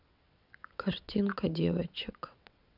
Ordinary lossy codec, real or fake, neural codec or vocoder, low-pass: none; fake; vocoder, 22.05 kHz, 80 mel bands, WaveNeXt; 5.4 kHz